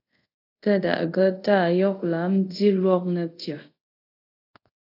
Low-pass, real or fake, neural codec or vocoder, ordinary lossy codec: 5.4 kHz; fake; codec, 24 kHz, 0.5 kbps, DualCodec; AAC, 32 kbps